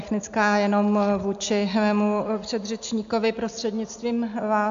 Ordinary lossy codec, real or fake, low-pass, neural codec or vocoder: AAC, 64 kbps; real; 7.2 kHz; none